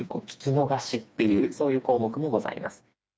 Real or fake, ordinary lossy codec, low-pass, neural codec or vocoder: fake; none; none; codec, 16 kHz, 2 kbps, FreqCodec, smaller model